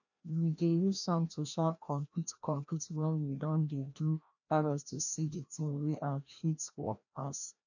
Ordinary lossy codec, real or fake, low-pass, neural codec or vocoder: none; fake; 7.2 kHz; codec, 16 kHz, 1 kbps, FreqCodec, larger model